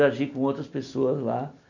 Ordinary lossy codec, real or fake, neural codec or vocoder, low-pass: none; real; none; 7.2 kHz